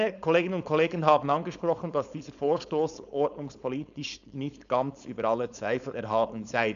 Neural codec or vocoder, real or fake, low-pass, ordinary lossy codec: codec, 16 kHz, 4.8 kbps, FACodec; fake; 7.2 kHz; none